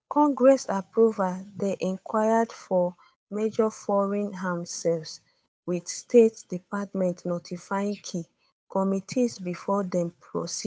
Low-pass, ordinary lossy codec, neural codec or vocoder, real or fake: none; none; codec, 16 kHz, 8 kbps, FunCodec, trained on Chinese and English, 25 frames a second; fake